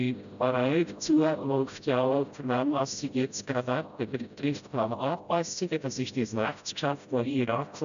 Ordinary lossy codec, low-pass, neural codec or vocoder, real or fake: none; 7.2 kHz; codec, 16 kHz, 0.5 kbps, FreqCodec, smaller model; fake